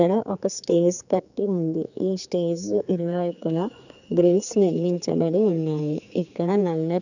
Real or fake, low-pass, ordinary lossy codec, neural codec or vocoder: fake; 7.2 kHz; none; codec, 16 kHz, 4 kbps, X-Codec, HuBERT features, trained on general audio